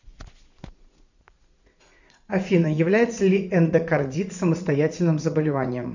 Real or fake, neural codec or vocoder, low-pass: fake; vocoder, 44.1 kHz, 80 mel bands, Vocos; 7.2 kHz